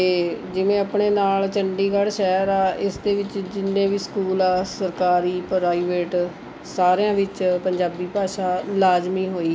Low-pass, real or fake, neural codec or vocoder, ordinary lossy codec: none; real; none; none